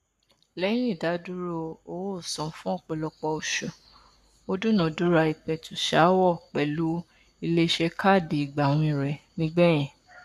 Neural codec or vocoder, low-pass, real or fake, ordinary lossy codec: codec, 44.1 kHz, 7.8 kbps, Pupu-Codec; 14.4 kHz; fake; none